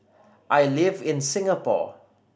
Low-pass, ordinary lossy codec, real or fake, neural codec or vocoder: none; none; real; none